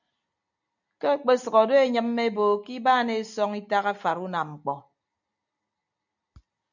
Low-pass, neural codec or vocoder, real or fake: 7.2 kHz; none; real